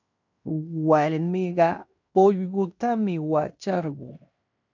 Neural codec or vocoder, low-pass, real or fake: codec, 16 kHz in and 24 kHz out, 0.9 kbps, LongCat-Audio-Codec, fine tuned four codebook decoder; 7.2 kHz; fake